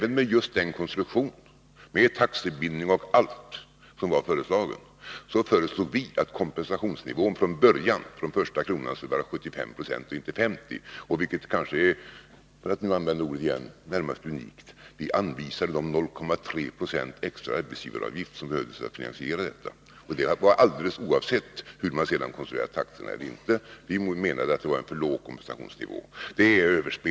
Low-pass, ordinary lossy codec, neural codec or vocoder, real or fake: none; none; none; real